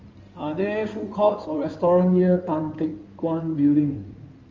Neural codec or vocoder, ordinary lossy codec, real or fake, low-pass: codec, 16 kHz in and 24 kHz out, 2.2 kbps, FireRedTTS-2 codec; Opus, 32 kbps; fake; 7.2 kHz